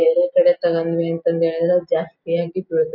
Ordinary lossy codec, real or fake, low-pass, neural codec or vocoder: none; real; 5.4 kHz; none